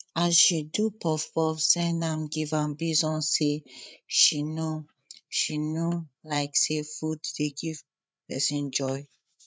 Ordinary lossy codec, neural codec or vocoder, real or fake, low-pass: none; codec, 16 kHz, 8 kbps, FreqCodec, larger model; fake; none